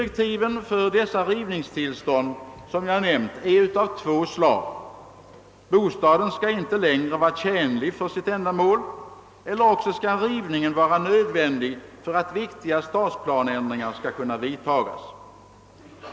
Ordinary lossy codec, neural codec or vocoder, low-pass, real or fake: none; none; none; real